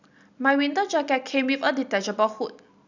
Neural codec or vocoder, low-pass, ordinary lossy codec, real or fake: none; 7.2 kHz; none; real